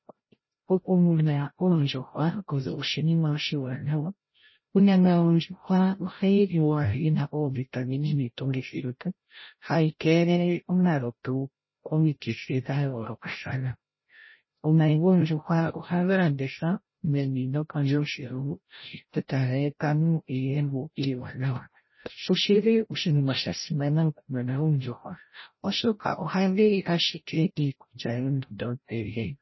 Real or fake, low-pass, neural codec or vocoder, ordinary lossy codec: fake; 7.2 kHz; codec, 16 kHz, 0.5 kbps, FreqCodec, larger model; MP3, 24 kbps